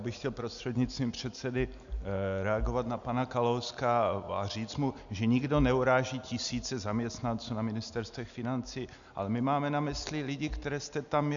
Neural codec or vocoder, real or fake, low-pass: none; real; 7.2 kHz